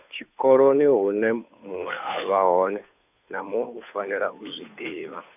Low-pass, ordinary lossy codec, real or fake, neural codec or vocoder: 3.6 kHz; none; fake; codec, 16 kHz, 2 kbps, FunCodec, trained on Chinese and English, 25 frames a second